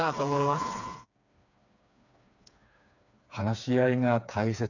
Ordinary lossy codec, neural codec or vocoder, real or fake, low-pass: none; codec, 16 kHz, 4 kbps, FreqCodec, smaller model; fake; 7.2 kHz